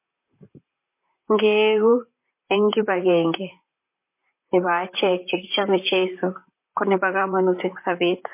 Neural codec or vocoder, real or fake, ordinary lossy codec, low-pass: vocoder, 44.1 kHz, 128 mel bands, Pupu-Vocoder; fake; MP3, 24 kbps; 3.6 kHz